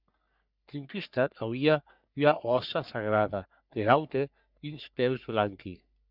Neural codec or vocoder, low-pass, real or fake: codec, 44.1 kHz, 3.4 kbps, Pupu-Codec; 5.4 kHz; fake